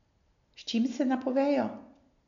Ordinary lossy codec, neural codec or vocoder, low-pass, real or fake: MP3, 96 kbps; none; 7.2 kHz; real